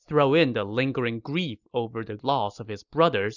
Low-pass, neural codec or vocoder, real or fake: 7.2 kHz; none; real